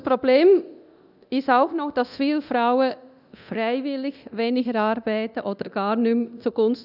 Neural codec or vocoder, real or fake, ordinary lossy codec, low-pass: codec, 24 kHz, 0.9 kbps, DualCodec; fake; none; 5.4 kHz